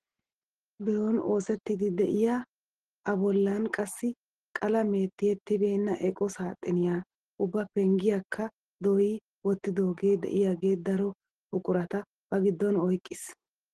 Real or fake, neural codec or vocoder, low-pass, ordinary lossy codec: real; none; 10.8 kHz; Opus, 16 kbps